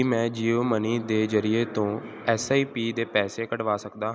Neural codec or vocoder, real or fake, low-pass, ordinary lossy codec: none; real; none; none